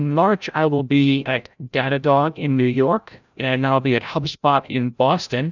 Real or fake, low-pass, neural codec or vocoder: fake; 7.2 kHz; codec, 16 kHz, 0.5 kbps, FreqCodec, larger model